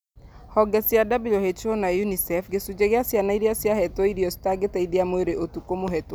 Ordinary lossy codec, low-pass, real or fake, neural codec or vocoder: none; none; real; none